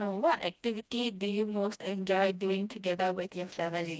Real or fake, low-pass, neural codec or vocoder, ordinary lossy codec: fake; none; codec, 16 kHz, 1 kbps, FreqCodec, smaller model; none